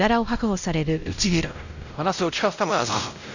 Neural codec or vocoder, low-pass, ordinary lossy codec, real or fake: codec, 16 kHz, 0.5 kbps, X-Codec, WavLM features, trained on Multilingual LibriSpeech; 7.2 kHz; none; fake